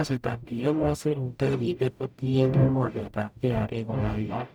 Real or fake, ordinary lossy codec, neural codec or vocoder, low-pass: fake; none; codec, 44.1 kHz, 0.9 kbps, DAC; none